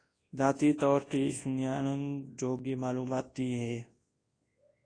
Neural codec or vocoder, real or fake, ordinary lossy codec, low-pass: codec, 24 kHz, 0.9 kbps, WavTokenizer, large speech release; fake; AAC, 32 kbps; 9.9 kHz